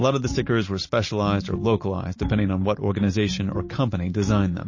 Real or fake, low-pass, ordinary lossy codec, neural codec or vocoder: real; 7.2 kHz; MP3, 32 kbps; none